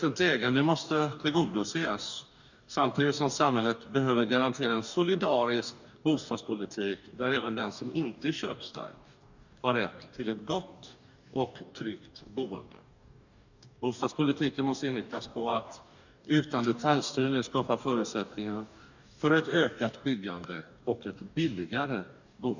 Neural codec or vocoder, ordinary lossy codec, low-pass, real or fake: codec, 44.1 kHz, 2.6 kbps, DAC; none; 7.2 kHz; fake